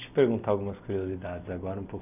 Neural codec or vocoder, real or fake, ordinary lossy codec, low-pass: none; real; none; 3.6 kHz